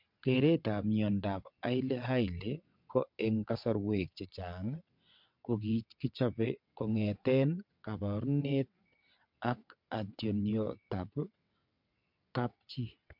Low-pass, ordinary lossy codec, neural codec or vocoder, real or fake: 5.4 kHz; MP3, 48 kbps; vocoder, 22.05 kHz, 80 mel bands, WaveNeXt; fake